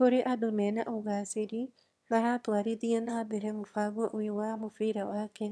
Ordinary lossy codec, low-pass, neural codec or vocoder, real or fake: none; none; autoencoder, 22.05 kHz, a latent of 192 numbers a frame, VITS, trained on one speaker; fake